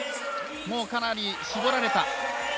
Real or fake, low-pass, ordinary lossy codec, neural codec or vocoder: real; none; none; none